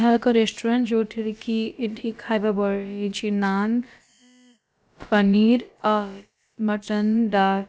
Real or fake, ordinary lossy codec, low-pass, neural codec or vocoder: fake; none; none; codec, 16 kHz, about 1 kbps, DyCAST, with the encoder's durations